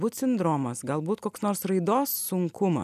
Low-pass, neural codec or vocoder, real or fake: 14.4 kHz; none; real